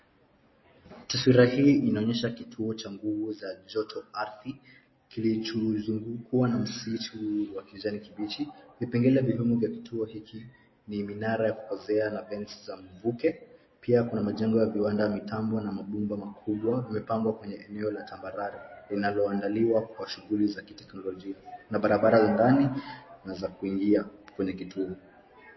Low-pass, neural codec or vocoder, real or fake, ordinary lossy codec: 7.2 kHz; none; real; MP3, 24 kbps